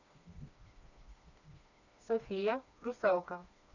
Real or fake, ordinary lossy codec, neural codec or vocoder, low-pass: fake; none; codec, 16 kHz, 2 kbps, FreqCodec, smaller model; 7.2 kHz